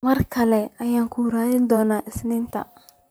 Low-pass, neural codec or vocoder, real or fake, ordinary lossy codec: none; vocoder, 44.1 kHz, 128 mel bands, Pupu-Vocoder; fake; none